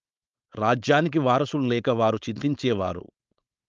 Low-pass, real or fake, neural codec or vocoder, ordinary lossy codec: 7.2 kHz; fake; codec, 16 kHz, 4.8 kbps, FACodec; Opus, 24 kbps